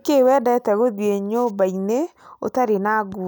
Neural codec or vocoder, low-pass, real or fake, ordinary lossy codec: none; none; real; none